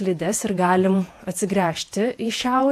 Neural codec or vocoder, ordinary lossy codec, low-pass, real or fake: vocoder, 48 kHz, 128 mel bands, Vocos; AAC, 64 kbps; 14.4 kHz; fake